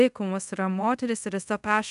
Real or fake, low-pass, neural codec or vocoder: fake; 10.8 kHz; codec, 24 kHz, 0.5 kbps, DualCodec